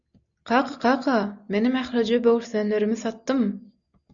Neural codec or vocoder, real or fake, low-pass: none; real; 7.2 kHz